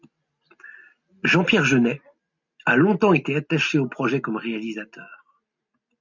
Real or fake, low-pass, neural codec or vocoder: real; 7.2 kHz; none